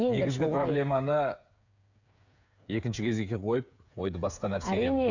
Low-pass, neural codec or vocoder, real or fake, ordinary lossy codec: 7.2 kHz; codec, 16 kHz, 8 kbps, FreqCodec, smaller model; fake; none